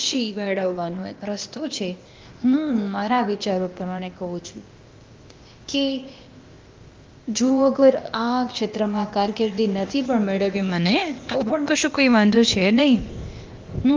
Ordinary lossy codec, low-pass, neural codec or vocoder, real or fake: Opus, 24 kbps; 7.2 kHz; codec, 16 kHz, 0.8 kbps, ZipCodec; fake